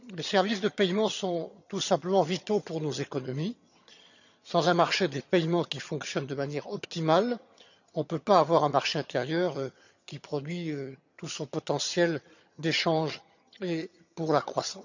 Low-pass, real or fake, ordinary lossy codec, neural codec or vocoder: 7.2 kHz; fake; none; vocoder, 22.05 kHz, 80 mel bands, HiFi-GAN